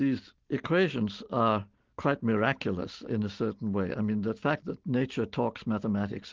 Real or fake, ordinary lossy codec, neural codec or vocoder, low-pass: real; Opus, 16 kbps; none; 7.2 kHz